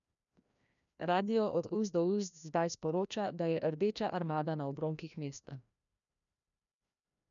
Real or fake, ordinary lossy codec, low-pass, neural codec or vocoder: fake; MP3, 96 kbps; 7.2 kHz; codec, 16 kHz, 1 kbps, FreqCodec, larger model